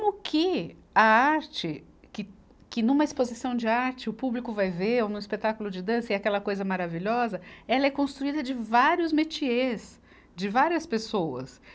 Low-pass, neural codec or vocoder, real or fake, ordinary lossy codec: none; none; real; none